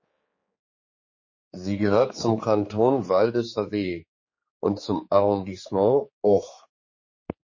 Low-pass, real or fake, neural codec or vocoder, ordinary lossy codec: 7.2 kHz; fake; codec, 16 kHz, 4 kbps, X-Codec, HuBERT features, trained on general audio; MP3, 32 kbps